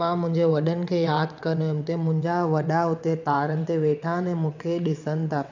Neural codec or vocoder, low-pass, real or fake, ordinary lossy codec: vocoder, 22.05 kHz, 80 mel bands, WaveNeXt; 7.2 kHz; fake; none